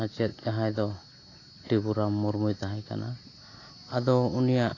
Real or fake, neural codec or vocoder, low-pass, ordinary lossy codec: real; none; 7.2 kHz; AAC, 32 kbps